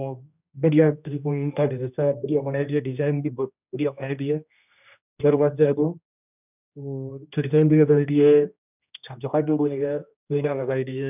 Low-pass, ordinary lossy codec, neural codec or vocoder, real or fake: 3.6 kHz; none; codec, 16 kHz, 1 kbps, X-Codec, HuBERT features, trained on general audio; fake